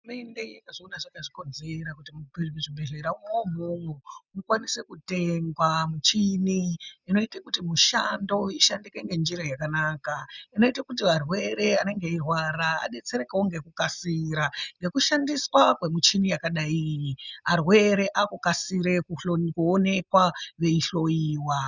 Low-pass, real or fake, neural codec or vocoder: 7.2 kHz; real; none